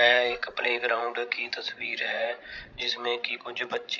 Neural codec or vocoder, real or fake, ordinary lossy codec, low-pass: codec, 16 kHz, 16 kbps, FreqCodec, larger model; fake; none; none